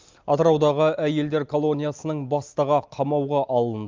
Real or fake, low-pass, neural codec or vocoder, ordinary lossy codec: real; 7.2 kHz; none; Opus, 32 kbps